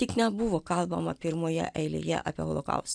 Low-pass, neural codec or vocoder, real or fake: 9.9 kHz; none; real